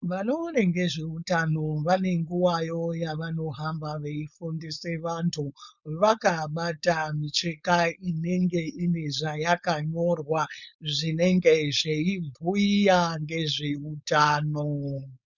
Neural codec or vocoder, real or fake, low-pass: codec, 16 kHz, 4.8 kbps, FACodec; fake; 7.2 kHz